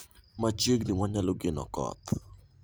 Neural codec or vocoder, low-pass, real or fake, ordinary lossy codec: vocoder, 44.1 kHz, 128 mel bands every 512 samples, BigVGAN v2; none; fake; none